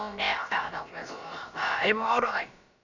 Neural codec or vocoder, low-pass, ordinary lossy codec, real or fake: codec, 16 kHz, about 1 kbps, DyCAST, with the encoder's durations; 7.2 kHz; none; fake